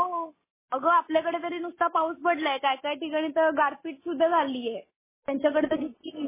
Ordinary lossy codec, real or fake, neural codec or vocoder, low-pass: MP3, 16 kbps; real; none; 3.6 kHz